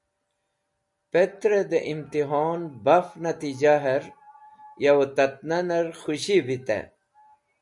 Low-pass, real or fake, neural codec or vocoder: 10.8 kHz; real; none